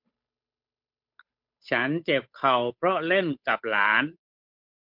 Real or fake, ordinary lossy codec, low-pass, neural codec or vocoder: fake; MP3, 48 kbps; 5.4 kHz; codec, 16 kHz, 8 kbps, FunCodec, trained on Chinese and English, 25 frames a second